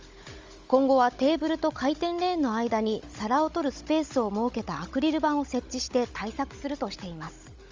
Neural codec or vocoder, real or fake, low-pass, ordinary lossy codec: codec, 16 kHz, 16 kbps, FunCodec, trained on Chinese and English, 50 frames a second; fake; 7.2 kHz; Opus, 32 kbps